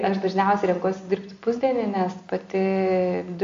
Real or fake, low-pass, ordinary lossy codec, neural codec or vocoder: real; 7.2 kHz; AAC, 48 kbps; none